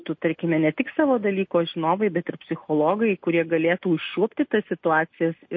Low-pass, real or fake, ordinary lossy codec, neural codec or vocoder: 7.2 kHz; real; MP3, 32 kbps; none